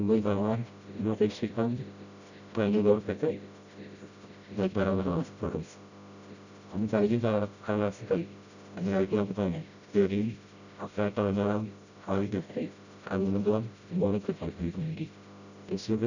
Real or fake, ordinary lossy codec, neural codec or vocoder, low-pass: fake; none; codec, 16 kHz, 0.5 kbps, FreqCodec, smaller model; 7.2 kHz